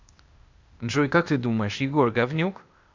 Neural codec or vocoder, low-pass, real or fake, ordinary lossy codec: codec, 16 kHz, 0.8 kbps, ZipCodec; 7.2 kHz; fake; MP3, 64 kbps